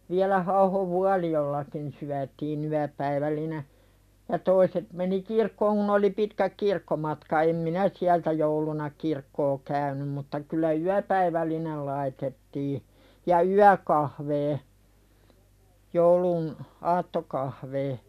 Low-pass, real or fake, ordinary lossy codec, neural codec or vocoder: 14.4 kHz; real; none; none